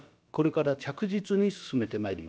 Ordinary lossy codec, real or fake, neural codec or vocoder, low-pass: none; fake; codec, 16 kHz, about 1 kbps, DyCAST, with the encoder's durations; none